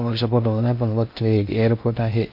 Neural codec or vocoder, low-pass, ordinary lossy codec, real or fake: codec, 16 kHz in and 24 kHz out, 0.6 kbps, FocalCodec, streaming, 4096 codes; 5.4 kHz; MP3, 32 kbps; fake